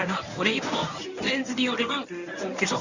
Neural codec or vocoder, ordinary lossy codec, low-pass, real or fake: codec, 24 kHz, 0.9 kbps, WavTokenizer, medium speech release version 1; none; 7.2 kHz; fake